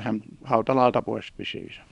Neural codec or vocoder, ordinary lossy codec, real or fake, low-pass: codec, 24 kHz, 0.9 kbps, WavTokenizer, small release; none; fake; 10.8 kHz